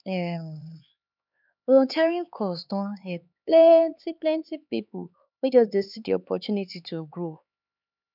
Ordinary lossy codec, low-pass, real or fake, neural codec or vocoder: none; 5.4 kHz; fake; codec, 16 kHz, 4 kbps, X-Codec, HuBERT features, trained on LibriSpeech